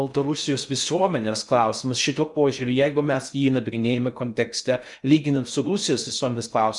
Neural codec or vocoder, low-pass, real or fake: codec, 16 kHz in and 24 kHz out, 0.6 kbps, FocalCodec, streaming, 2048 codes; 10.8 kHz; fake